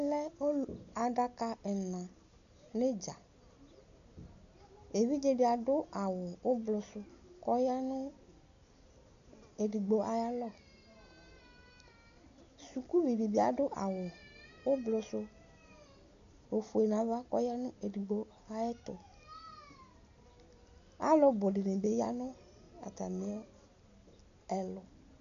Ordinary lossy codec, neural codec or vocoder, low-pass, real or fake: MP3, 96 kbps; none; 7.2 kHz; real